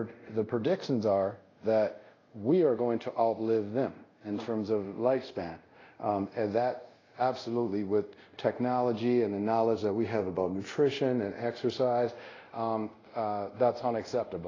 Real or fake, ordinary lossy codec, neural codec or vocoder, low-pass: fake; AAC, 32 kbps; codec, 24 kHz, 0.5 kbps, DualCodec; 7.2 kHz